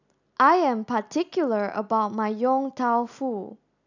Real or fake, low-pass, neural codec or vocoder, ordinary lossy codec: real; 7.2 kHz; none; none